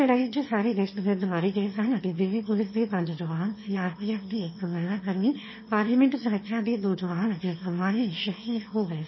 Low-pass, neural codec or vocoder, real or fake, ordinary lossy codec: 7.2 kHz; autoencoder, 22.05 kHz, a latent of 192 numbers a frame, VITS, trained on one speaker; fake; MP3, 24 kbps